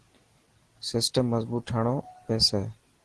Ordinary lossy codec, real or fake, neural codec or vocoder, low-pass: Opus, 16 kbps; real; none; 10.8 kHz